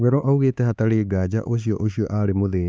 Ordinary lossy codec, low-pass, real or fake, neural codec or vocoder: none; none; fake; codec, 16 kHz, 4 kbps, X-Codec, HuBERT features, trained on LibriSpeech